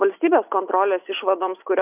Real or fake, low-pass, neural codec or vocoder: real; 3.6 kHz; none